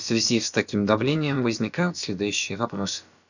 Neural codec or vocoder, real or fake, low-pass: codec, 16 kHz, about 1 kbps, DyCAST, with the encoder's durations; fake; 7.2 kHz